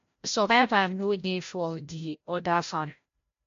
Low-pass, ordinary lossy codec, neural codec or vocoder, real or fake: 7.2 kHz; MP3, 48 kbps; codec, 16 kHz, 0.5 kbps, FreqCodec, larger model; fake